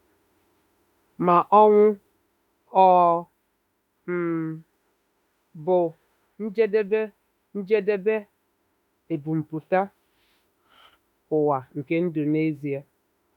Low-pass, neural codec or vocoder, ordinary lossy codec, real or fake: 19.8 kHz; autoencoder, 48 kHz, 32 numbers a frame, DAC-VAE, trained on Japanese speech; none; fake